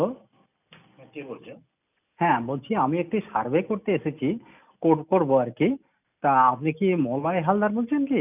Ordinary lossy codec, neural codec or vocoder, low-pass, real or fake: none; none; 3.6 kHz; real